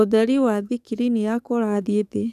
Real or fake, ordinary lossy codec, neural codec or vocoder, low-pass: fake; none; codec, 44.1 kHz, 7.8 kbps, DAC; 14.4 kHz